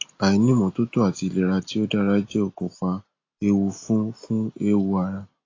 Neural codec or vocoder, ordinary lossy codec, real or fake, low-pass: none; AAC, 32 kbps; real; 7.2 kHz